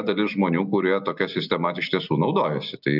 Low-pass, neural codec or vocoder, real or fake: 5.4 kHz; none; real